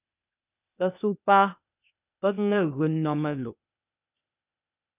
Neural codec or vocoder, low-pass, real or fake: codec, 16 kHz, 0.8 kbps, ZipCodec; 3.6 kHz; fake